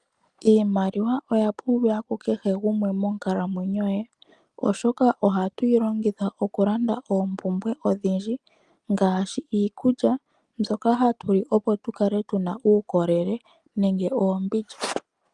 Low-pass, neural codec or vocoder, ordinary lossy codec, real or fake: 10.8 kHz; none; Opus, 32 kbps; real